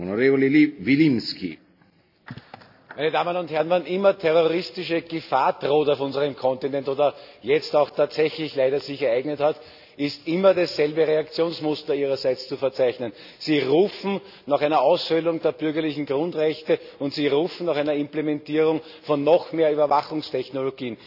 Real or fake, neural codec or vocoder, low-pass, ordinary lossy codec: real; none; 5.4 kHz; none